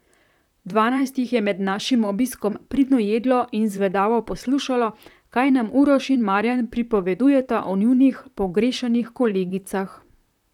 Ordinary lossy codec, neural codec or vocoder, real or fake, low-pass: none; vocoder, 44.1 kHz, 128 mel bands, Pupu-Vocoder; fake; 19.8 kHz